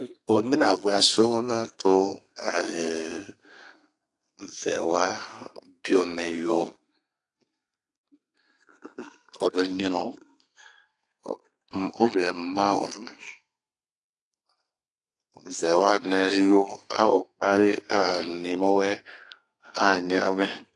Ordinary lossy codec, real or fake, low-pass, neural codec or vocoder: AAC, 48 kbps; fake; 10.8 kHz; codec, 32 kHz, 1.9 kbps, SNAC